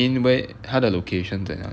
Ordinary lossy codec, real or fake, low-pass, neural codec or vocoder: none; real; none; none